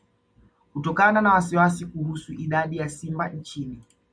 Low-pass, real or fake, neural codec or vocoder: 9.9 kHz; real; none